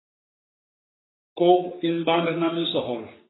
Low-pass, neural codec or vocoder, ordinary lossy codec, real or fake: 7.2 kHz; codec, 44.1 kHz, 3.4 kbps, Pupu-Codec; AAC, 16 kbps; fake